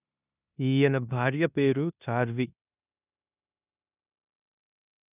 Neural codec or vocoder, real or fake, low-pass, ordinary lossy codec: codec, 16 kHz in and 24 kHz out, 0.9 kbps, LongCat-Audio-Codec, four codebook decoder; fake; 3.6 kHz; none